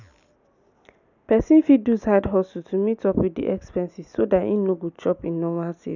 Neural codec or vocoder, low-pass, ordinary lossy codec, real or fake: none; 7.2 kHz; none; real